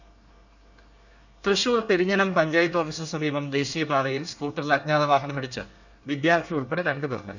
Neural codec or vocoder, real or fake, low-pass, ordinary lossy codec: codec, 24 kHz, 1 kbps, SNAC; fake; 7.2 kHz; none